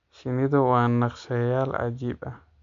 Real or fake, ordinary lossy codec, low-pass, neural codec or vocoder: real; MP3, 64 kbps; 7.2 kHz; none